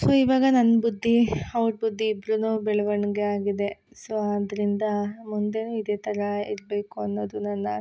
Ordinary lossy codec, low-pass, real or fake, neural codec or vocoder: none; none; real; none